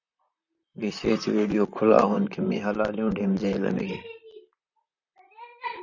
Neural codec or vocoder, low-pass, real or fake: vocoder, 44.1 kHz, 128 mel bands, Pupu-Vocoder; 7.2 kHz; fake